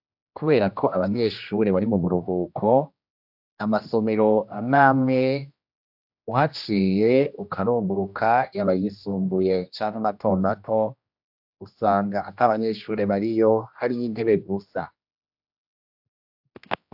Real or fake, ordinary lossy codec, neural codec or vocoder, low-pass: fake; AAC, 48 kbps; codec, 16 kHz, 1 kbps, X-Codec, HuBERT features, trained on general audio; 5.4 kHz